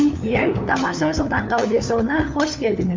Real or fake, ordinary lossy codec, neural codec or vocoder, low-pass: fake; MP3, 64 kbps; codec, 16 kHz, 4 kbps, FunCodec, trained on LibriTTS, 50 frames a second; 7.2 kHz